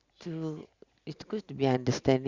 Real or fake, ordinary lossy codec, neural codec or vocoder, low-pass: real; Opus, 64 kbps; none; 7.2 kHz